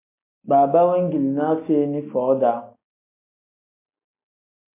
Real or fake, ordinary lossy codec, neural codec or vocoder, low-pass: real; MP3, 24 kbps; none; 3.6 kHz